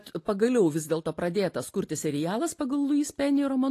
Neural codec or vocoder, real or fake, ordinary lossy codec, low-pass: none; real; AAC, 48 kbps; 14.4 kHz